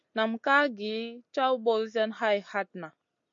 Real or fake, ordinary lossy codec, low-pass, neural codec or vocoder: real; MP3, 48 kbps; 7.2 kHz; none